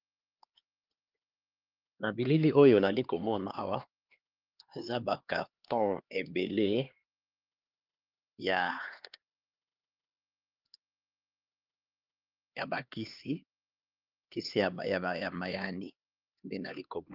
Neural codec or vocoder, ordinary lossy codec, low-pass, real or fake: codec, 16 kHz, 2 kbps, X-Codec, HuBERT features, trained on LibriSpeech; Opus, 32 kbps; 5.4 kHz; fake